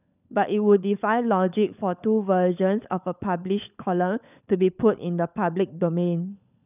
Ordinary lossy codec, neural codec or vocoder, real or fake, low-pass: none; codec, 16 kHz, 16 kbps, FunCodec, trained on LibriTTS, 50 frames a second; fake; 3.6 kHz